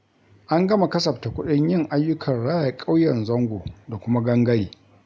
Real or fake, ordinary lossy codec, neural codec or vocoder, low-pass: real; none; none; none